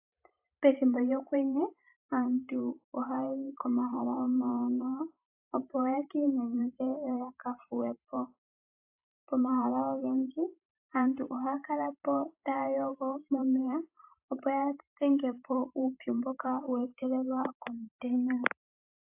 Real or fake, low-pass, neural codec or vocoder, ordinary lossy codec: fake; 3.6 kHz; vocoder, 44.1 kHz, 128 mel bands every 512 samples, BigVGAN v2; AAC, 32 kbps